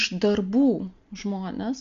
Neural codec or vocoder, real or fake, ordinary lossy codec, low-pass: none; real; MP3, 48 kbps; 7.2 kHz